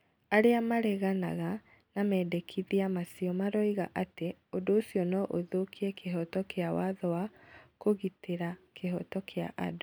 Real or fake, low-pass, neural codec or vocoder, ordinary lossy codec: real; none; none; none